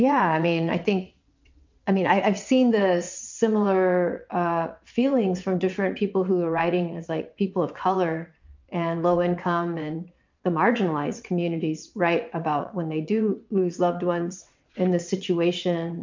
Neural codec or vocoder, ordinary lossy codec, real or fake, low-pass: vocoder, 22.05 kHz, 80 mel bands, WaveNeXt; MP3, 64 kbps; fake; 7.2 kHz